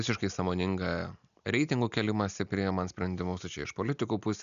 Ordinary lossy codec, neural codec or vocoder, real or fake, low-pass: MP3, 96 kbps; none; real; 7.2 kHz